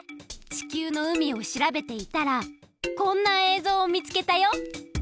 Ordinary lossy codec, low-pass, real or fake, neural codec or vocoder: none; none; real; none